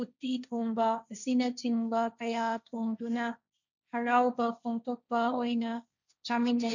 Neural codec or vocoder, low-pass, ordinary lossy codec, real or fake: codec, 16 kHz, 1.1 kbps, Voila-Tokenizer; 7.2 kHz; none; fake